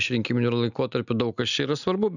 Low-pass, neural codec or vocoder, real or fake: 7.2 kHz; none; real